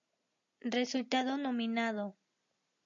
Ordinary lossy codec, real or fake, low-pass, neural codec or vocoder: MP3, 48 kbps; real; 7.2 kHz; none